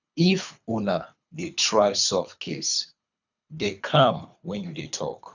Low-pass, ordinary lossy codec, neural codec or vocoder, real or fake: 7.2 kHz; none; codec, 24 kHz, 3 kbps, HILCodec; fake